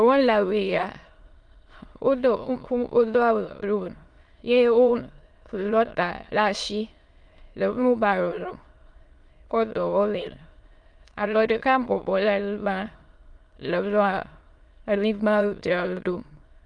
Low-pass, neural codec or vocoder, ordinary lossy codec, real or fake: 9.9 kHz; autoencoder, 22.05 kHz, a latent of 192 numbers a frame, VITS, trained on many speakers; Opus, 32 kbps; fake